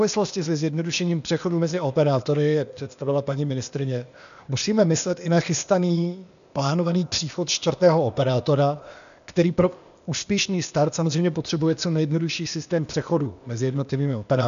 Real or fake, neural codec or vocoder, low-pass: fake; codec, 16 kHz, 0.8 kbps, ZipCodec; 7.2 kHz